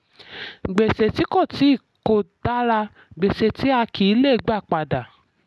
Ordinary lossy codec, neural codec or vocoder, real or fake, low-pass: none; none; real; none